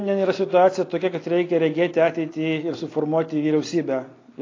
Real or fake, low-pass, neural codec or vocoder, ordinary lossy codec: real; 7.2 kHz; none; AAC, 32 kbps